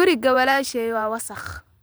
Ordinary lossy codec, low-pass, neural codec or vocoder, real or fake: none; none; vocoder, 44.1 kHz, 128 mel bands every 512 samples, BigVGAN v2; fake